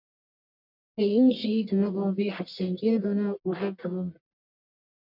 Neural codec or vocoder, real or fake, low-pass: codec, 44.1 kHz, 1.7 kbps, Pupu-Codec; fake; 5.4 kHz